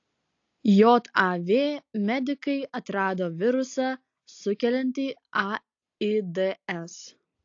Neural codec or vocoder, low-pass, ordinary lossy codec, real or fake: none; 7.2 kHz; AAC, 48 kbps; real